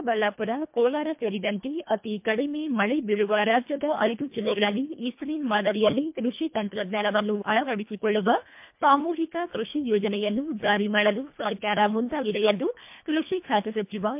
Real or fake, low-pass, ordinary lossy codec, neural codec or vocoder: fake; 3.6 kHz; MP3, 32 kbps; codec, 24 kHz, 1.5 kbps, HILCodec